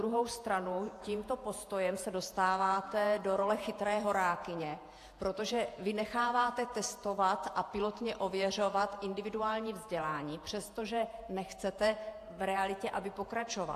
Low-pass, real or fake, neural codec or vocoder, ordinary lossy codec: 14.4 kHz; fake; vocoder, 48 kHz, 128 mel bands, Vocos; AAC, 64 kbps